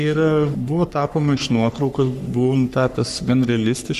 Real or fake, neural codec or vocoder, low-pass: fake; codec, 44.1 kHz, 3.4 kbps, Pupu-Codec; 14.4 kHz